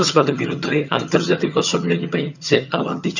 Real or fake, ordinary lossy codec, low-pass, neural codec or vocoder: fake; none; 7.2 kHz; vocoder, 22.05 kHz, 80 mel bands, HiFi-GAN